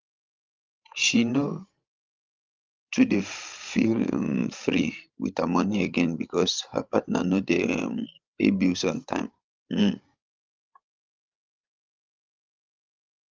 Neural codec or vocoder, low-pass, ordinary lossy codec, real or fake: codec, 16 kHz, 16 kbps, FreqCodec, larger model; 7.2 kHz; Opus, 32 kbps; fake